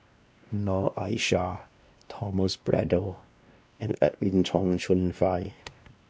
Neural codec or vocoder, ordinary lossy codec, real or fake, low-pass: codec, 16 kHz, 1 kbps, X-Codec, WavLM features, trained on Multilingual LibriSpeech; none; fake; none